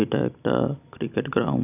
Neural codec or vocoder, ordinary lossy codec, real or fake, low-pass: none; none; real; 3.6 kHz